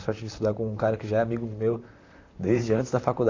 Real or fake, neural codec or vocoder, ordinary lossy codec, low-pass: fake; vocoder, 44.1 kHz, 128 mel bands, Pupu-Vocoder; AAC, 48 kbps; 7.2 kHz